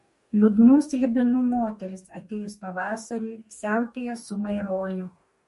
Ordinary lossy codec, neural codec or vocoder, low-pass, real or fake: MP3, 48 kbps; codec, 44.1 kHz, 2.6 kbps, DAC; 14.4 kHz; fake